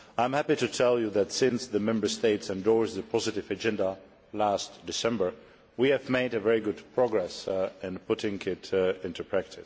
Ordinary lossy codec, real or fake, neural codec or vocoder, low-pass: none; real; none; none